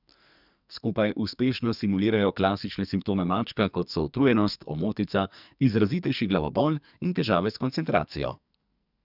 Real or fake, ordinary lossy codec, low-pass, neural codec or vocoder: fake; none; 5.4 kHz; codec, 44.1 kHz, 2.6 kbps, SNAC